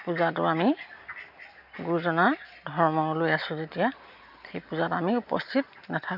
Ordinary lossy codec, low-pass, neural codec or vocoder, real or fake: none; 5.4 kHz; none; real